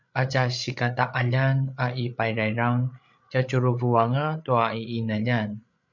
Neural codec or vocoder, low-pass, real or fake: codec, 16 kHz, 8 kbps, FreqCodec, larger model; 7.2 kHz; fake